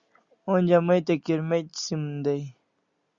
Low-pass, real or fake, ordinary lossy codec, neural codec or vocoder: 7.2 kHz; real; Opus, 64 kbps; none